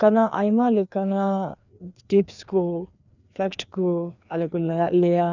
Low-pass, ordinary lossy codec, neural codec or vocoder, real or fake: 7.2 kHz; none; codec, 16 kHz, 2 kbps, FreqCodec, larger model; fake